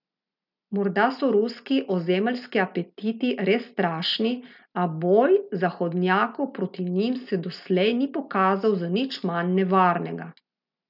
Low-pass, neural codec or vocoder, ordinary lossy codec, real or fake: 5.4 kHz; none; none; real